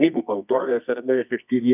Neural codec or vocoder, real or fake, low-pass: codec, 24 kHz, 0.9 kbps, WavTokenizer, medium music audio release; fake; 3.6 kHz